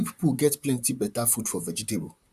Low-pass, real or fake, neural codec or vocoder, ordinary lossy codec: 14.4 kHz; fake; vocoder, 44.1 kHz, 128 mel bands, Pupu-Vocoder; none